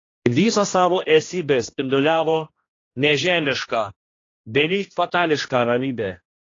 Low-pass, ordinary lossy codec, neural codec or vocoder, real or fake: 7.2 kHz; AAC, 32 kbps; codec, 16 kHz, 1 kbps, X-Codec, HuBERT features, trained on general audio; fake